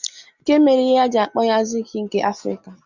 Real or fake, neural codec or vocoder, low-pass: real; none; 7.2 kHz